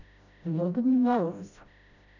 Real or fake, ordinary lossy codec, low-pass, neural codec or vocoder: fake; none; 7.2 kHz; codec, 16 kHz, 0.5 kbps, FreqCodec, smaller model